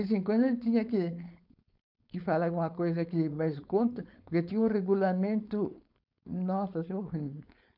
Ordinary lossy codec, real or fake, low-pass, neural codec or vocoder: none; fake; 5.4 kHz; codec, 16 kHz, 4.8 kbps, FACodec